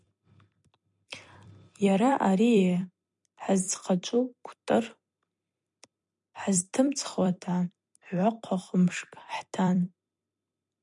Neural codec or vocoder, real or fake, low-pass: vocoder, 44.1 kHz, 128 mel bands every 256 samples, BigVGAN v2; fake; 10.8 kHz